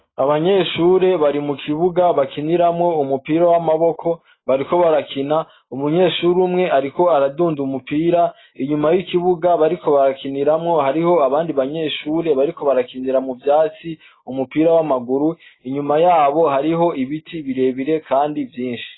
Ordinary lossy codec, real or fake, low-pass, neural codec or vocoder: AAC, 16 kbps; real; 7.2 kHz; none